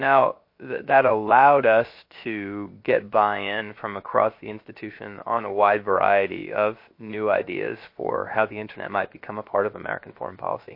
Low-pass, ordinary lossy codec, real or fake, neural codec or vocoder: 5.4 kHz; MP3, 32 kbps; fake; codec, 16 kHz, about 1 kbps, DyCAST, with the encoder's durations